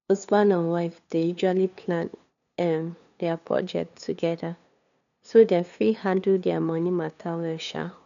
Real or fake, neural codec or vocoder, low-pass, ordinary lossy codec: fake; codec, 16 kHz, 2 kbps, FunCodec, trained on LibriTTS, 25 frames a second; 7.2 kHz; none